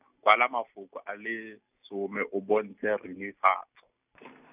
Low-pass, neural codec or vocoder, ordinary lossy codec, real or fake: 3.6 kHz; none; none; real